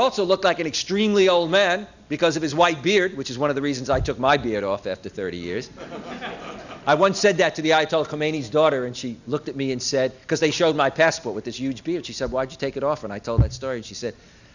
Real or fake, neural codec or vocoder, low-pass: real; none; 7.2 kHz